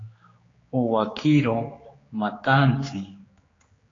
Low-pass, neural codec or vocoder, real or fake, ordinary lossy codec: 7.2 kHz; codec, 16 kHz, 4 kbps, X-Codec, HuBERT features, trained on general audio; fake; AAC, 32 kbps